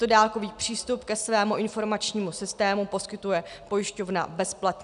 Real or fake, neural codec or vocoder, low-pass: real; none; 10.8 kHz